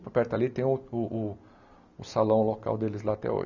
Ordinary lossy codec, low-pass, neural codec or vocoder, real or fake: none; 7.2 kHz; none; real